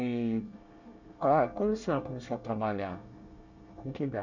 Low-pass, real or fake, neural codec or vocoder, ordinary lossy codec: 7.2 kHz; fake; codec, 24 kHz, 1 kbps, SNAC; none